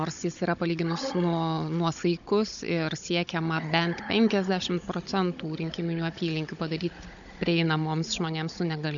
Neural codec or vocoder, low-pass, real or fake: codec, 16 kHz, 16 kbps, FunCodec, trained on Chinese and English, 50 frames a second; 7.2 kHz; fake